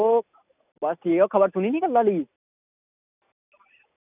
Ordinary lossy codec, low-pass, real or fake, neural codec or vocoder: none; 3.6 kHz; real; none